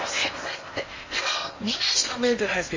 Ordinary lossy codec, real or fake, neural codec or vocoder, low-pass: MP3, 32 kbps; fake; codec, 16 kHz in and 24 kHz out, 0.8 kbps, FocalCodec, streaming, 65536 codes; 7.2 kHz